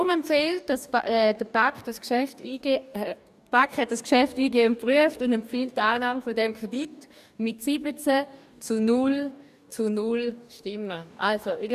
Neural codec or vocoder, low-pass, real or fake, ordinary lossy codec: codec, 44.1 kHz, 2.6 kbps, DAC; 14.4 kHz; fake; none